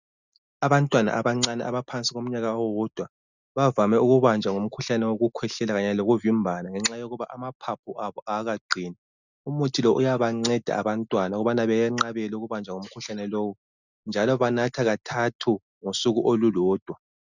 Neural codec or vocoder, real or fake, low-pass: none; real; 7.2 kHz